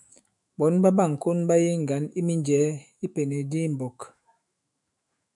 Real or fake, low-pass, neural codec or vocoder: fake; 10.8 kHz; autoencoder, 48 kHz, 128 numbers a frame, DAC-VAE, trained on Japanese speech